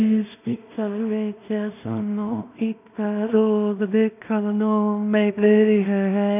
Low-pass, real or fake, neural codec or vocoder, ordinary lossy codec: 3.6 kHz; fake; codec, 16 kHz in and 24 kHz out, 0.4 kbps, LongCat-Audio-Codec, two codebook decoder; none